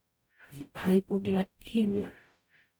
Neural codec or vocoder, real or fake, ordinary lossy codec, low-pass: codec, 44.1 kHz, 0.9 kbps, DAC; fake; none; none